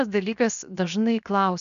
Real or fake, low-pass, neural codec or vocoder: fake; 7.2 kHz; codec, 16 kHz, 0.7 kbps, FocalCodec